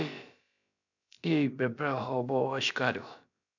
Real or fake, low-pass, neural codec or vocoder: fake; 7.2 kHz; codec, 16 kHz, about 1 kbps, DyCAST, with the encoder's durations